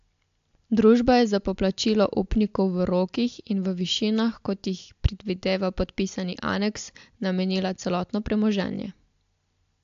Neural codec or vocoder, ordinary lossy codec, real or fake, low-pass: none; AAC, 64 kbps; real; 7.2 kHz